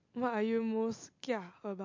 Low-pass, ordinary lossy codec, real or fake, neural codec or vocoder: 7.2 kHz; MP3, 48 kbps; real; none